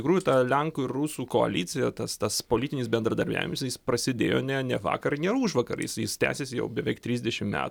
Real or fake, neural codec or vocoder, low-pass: real; none; 19.8 kHz